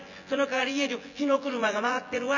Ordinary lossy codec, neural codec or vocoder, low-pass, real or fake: none; vocoder, 24 kHz, 100 mel bands, Vocos; 7.2 kHz; fake